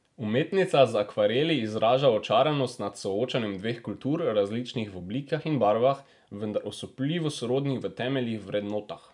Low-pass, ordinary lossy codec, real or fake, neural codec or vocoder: 10.8 kHz; none; real; none